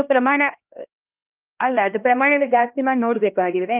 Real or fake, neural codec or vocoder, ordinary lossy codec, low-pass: fake; codec, 16 kHz, 1 kbps, X-Codec, HuBERT features, trained on LibriSpeech; Opus, 32 kbps; 3.6 kHz